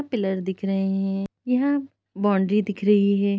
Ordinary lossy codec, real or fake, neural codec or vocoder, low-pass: none; real; none; none